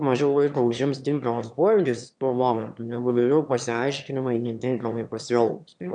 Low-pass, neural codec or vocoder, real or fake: 9.9 kHz; autoencoder, 22.05 kHz, a latent of 192 numbers a frame, VITS, trained on one speaker; fake